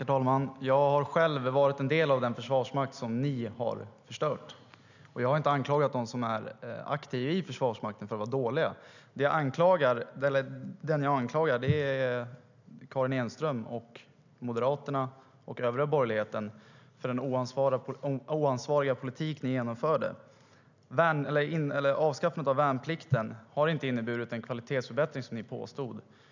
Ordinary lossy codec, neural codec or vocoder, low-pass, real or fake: none; none; 7.2 kHz; real